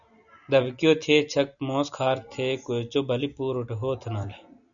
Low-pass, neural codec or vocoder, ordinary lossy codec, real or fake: 7.2 kHz; none; MP3, 96 kbps; real